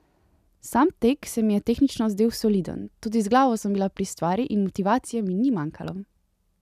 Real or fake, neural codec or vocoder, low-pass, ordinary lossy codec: real; none; 14.4 kHz; none